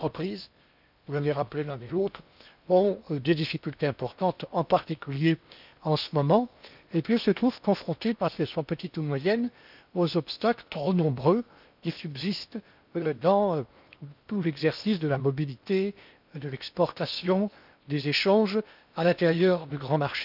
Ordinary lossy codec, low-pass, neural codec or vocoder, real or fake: none; 5.4 kHz; codec, 16 kHz in and 24 kHz out, 0.8 kbps, FocalCodec, streaming, 65536 codes; fake